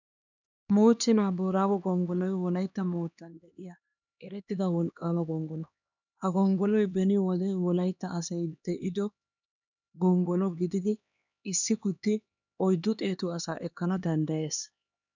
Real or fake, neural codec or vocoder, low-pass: fake; codec, 16 kHz, 2 kbps, X-Codec, HuBERT features, trained on LibriSpeech; 7.2 kHz